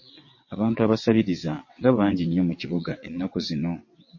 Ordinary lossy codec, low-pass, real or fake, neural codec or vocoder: MP3, 32 kbps; 7.2 kHz; fake; vocoder, 22.05 kHz, 80 mel bands, WaveNeXt